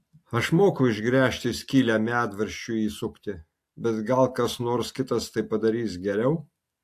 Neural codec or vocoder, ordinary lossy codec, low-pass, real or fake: none; AAC, 64 kbps; 14.4 kHz; real